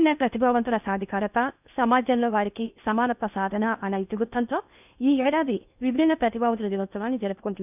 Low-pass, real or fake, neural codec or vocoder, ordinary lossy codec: 3.6 kHz; fake; codec, 16 kHz in and 24 kHz out, 0.8 kbps, FocalCodec, streaming, 65536 codes; none